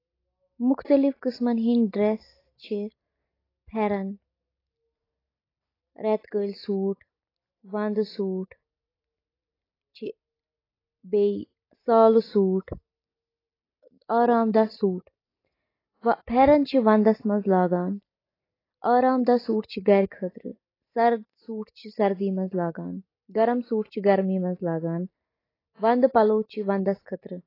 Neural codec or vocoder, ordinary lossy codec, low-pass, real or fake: none; AAC, 24 kbps; 5.4 kHz; real